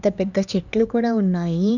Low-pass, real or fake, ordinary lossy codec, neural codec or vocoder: 7.2 kHz; fake; none; codec, 16 kHz, 2 kbps, X-Codec, HuBERT features, trained on LibriSpeech